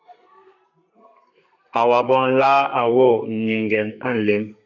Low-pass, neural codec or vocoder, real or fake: 7.2 kHz; codec, 32 kHz, 1.9 kbps, SNAC; fake